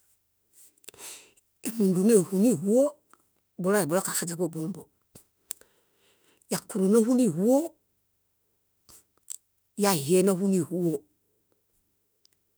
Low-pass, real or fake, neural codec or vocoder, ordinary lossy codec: none; fake; autoencoder, 48 kHz, 32 numbers a frame, DAC-VAE, trained on Japanese speech; none